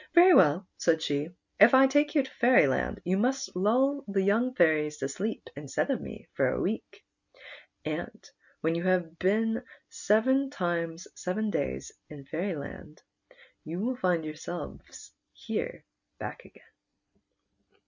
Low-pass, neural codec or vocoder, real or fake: 7.2 kHz; none; real